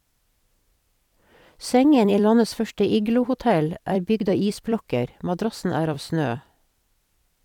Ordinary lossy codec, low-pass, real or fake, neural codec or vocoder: none; 19.8 kHz; fake; vocoder, 44.1 kHz, 128 mel bands every 256 samples, BigVGAN v2